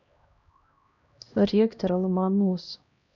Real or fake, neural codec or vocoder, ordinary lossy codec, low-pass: fake; codec, 16 kHz, 1 kbps, X-Codec, HuBERT features, trained on LibriSpeech; none; 7.2 kHz